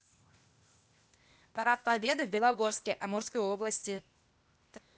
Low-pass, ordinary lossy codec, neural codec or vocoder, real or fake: none; none; codec, 16 kHz, 0.8 kbps, ZipCodec; fake